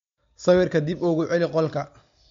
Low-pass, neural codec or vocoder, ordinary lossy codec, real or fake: 7.2 kHz; none; MP3, 48 kbps; real